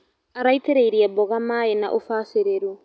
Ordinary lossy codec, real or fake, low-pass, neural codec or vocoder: none; real; none; none